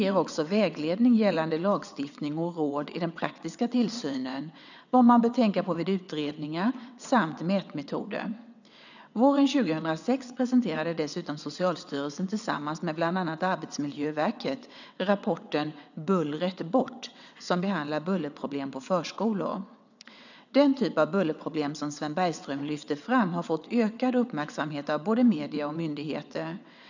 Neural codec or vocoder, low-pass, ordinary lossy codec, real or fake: vocoder, 22.05 kHz, 80 mel bands, WaveNeXt; 7.2 kHz; none; fake